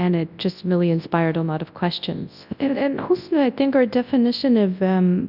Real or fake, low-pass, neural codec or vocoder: fake; 5.4 kHz; codec, 24 kHz, 0.9 kbps, WavTokenizer, large speech release